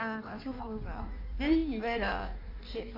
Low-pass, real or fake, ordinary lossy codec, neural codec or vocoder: 5.4 kHz; fake; AAC, 48 kbps; codec, 16 kHz in and 24 kHz out, 1.1 kbps, FireRedTTS-2 codec